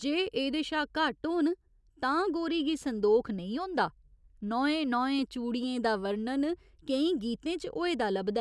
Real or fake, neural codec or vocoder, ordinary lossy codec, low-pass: real; none; none; none